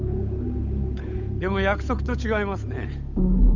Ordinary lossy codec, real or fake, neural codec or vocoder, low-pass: none; fake; codec, 16 kHz, 8 kbps, FunCodec, trained on Chinese and English, 25 frames a second; 7.2 kHz